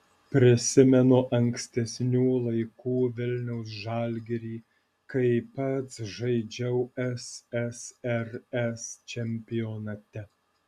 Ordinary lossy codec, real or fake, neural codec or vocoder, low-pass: Opus, 64 kbps; real; none; 14.4 kHz